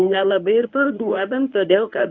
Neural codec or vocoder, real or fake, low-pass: codec, 24 kHz, 0.9 kbps, WavTokenizer, medium speech release version 1; fake; 7.2 kHz